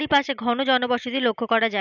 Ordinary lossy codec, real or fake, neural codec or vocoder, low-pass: none; real; none; 7.2 kHz